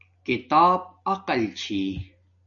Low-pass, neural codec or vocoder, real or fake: 7.2 kHz; none; real